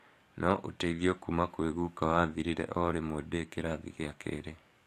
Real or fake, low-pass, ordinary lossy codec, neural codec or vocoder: fake; 14.4 kHz; AAC, 64 kbps; codec, 44.1 kHz, 7.8 kbps, DAC